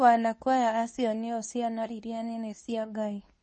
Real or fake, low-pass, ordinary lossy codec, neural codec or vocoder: fake; 9.9 kHz; MP3, 32 kbps; codec, 24 kHz, 0.9 kbps, WavTokenizer, medium speech release version 2